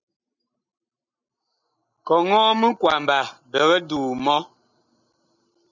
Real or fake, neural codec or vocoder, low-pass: real; none; 7.2 kHz